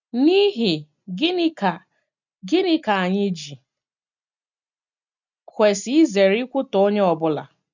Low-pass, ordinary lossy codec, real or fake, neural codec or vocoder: 7.2 kHz; none; real; none